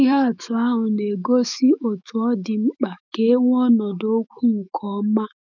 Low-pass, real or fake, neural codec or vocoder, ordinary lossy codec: 7.2 kHz; fake; autoencoder, 48 kHz, 128 numbers a frame, DAC-VAE, trained on Japanese speech; none